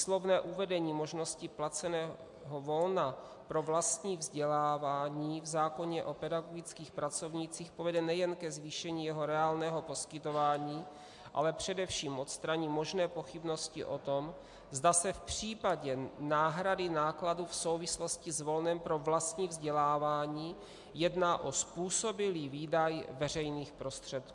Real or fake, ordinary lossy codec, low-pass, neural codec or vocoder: real; MP3, 64 kbps; 10.8 kHz; none